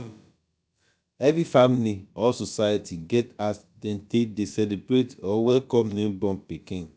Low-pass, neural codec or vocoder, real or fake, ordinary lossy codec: none; codec, 16 kHz, about 1 kbps, DyCAST, with the encoder's durations; fake; none